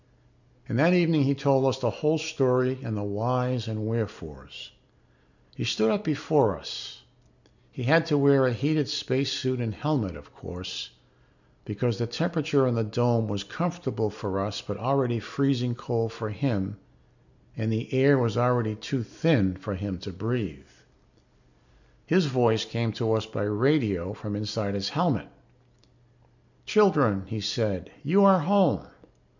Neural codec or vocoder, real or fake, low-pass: none; real; 7.2 kHz